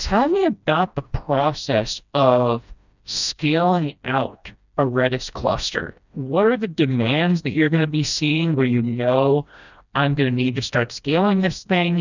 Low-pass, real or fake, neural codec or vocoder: 7.2 kHz; fake; codec, 16 kHz, 1 kbps, FreqCodec, smaller model